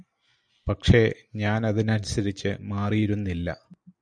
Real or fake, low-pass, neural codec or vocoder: real; 9.9 kHz; none